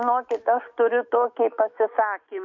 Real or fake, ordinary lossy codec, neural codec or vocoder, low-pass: fake; MP3, 48 kbps; autoencoder, 48 kHz, 128 numbers a frame, DAC-VAE, trained on Japanese speech; 7.2 kHz